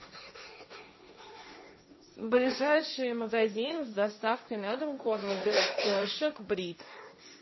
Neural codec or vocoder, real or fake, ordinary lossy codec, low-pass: codec, 16 kHz, 1.1 kbps, Voila-Tokenizer; fake; MP3, 24 kbps; 7.2 kHz